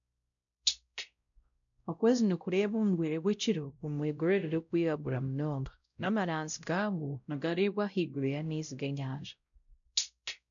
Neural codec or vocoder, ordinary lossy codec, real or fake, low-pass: codec, 16 kHz, 0.5 kbps, X-Codec, WavLM features, trained on Multilingual LibriSpeech; none; fake; 7.2 kHz